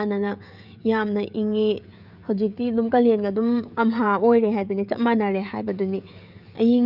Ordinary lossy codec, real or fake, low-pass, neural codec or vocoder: none; fake; 5.4 kHz; codec, 16 kHz, 16 kbps, FreqCodec, smaller model